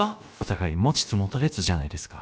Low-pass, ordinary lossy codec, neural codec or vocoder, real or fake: none; none; codec, 16 kHz, about 1 kbps, DyCAST, with the encoder's durations; fake